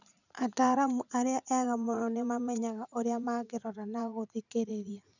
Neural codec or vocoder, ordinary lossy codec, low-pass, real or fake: vocoder, 44.1 kHz, 80 mel bands, Vocos; none; 7.2 kHz; fake